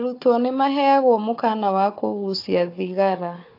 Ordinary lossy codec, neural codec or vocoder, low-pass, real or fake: AAC, 32 kbps; codec, 16 kHz, 4 kbps, FunCodec, trained on Chinese and English, 50 frames a second; 5.4 kHz; fake